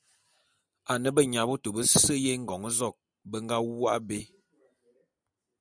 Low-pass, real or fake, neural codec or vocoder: 9.9 kHz; real; none